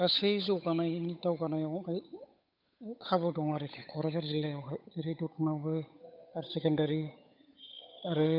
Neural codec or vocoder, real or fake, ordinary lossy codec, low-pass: codec, 16 kHz, 8 kbps, FunCodec, trained on Chinese and English, 25 frames a second; fake; none; 5.4 kHz